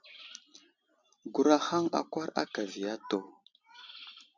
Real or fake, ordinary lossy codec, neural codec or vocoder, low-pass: real; MP3, 64 kbps; none; 7.2 kHz